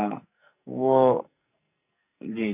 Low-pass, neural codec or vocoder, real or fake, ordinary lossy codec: 3.6 kHz; codec, 44.1 kHz, 2.6 kbps, SNAC; fake; none